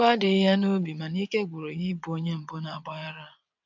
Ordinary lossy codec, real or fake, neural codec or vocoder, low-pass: MP3, 64 kbps; fake; vocoder, 22.05 kHz, 80 mel bands, WaveNeXt; 7.2 kHz